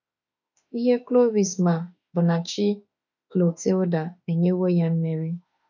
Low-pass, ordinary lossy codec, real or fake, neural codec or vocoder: 7.2 kHz; none; fake; autoencoder, 48 kHz, 32 numbers a frame, DAC-VAE, trained on Japanese speech